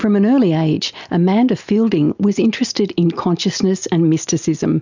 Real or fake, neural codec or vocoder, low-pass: real; none; 7.2 kHz